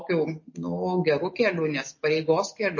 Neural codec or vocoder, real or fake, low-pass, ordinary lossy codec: none; real; 7.2 kHz; MP3, 32 kbps